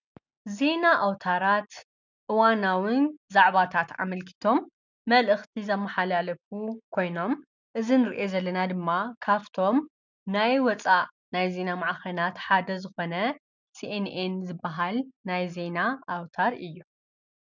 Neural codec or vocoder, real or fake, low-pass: none; real; 7.2 kHz